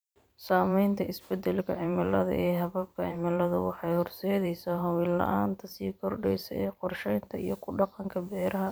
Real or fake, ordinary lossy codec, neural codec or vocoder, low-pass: fake; none; vocoder, 44.1 kHz, 128 mel bands every 512 samples, BigVGAN v2; none